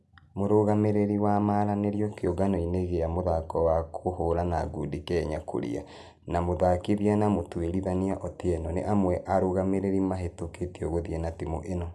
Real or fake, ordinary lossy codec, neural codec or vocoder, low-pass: real; none; none; none